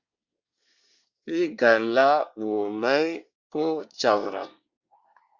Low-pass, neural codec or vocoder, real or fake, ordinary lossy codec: 7.2 kHz; codec, 24 kHz, 1 kbps, SNAC; fake; Opus, 64 kbps